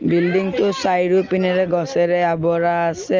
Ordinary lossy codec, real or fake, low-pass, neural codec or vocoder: Opus, 32 kbps; real; 7.2 kHz; none